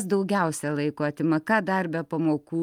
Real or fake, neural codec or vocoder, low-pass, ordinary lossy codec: real; none; 19.8 kHz; Opus, 32 kbps